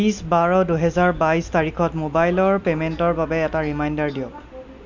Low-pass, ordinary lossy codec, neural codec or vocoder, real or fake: 7.2 kHz; none; none; real